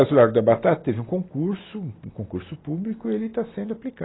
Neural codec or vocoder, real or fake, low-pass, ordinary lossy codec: none; real; 7.2 kHz; AAC, 16 kbps